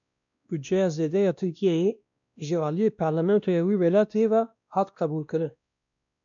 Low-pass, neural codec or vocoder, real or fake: 7.2 kHz; codec, 16 kHz, 1 kbps, X-Codec, WavLM features, trained on Multilingual LibriSpeech; fake